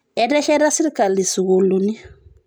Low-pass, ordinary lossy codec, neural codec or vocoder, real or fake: none; none; none; real